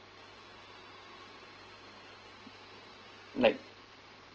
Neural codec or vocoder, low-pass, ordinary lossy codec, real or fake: none; 7.2 kHz; Opus, 16 kbps; real